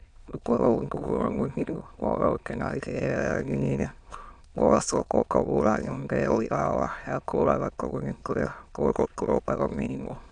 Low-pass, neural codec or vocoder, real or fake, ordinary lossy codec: 9.9 kHz; autoencoder, 22.05 kHz, a latent of 192 numbers a frame, VITS, trained on many speakers; fake; none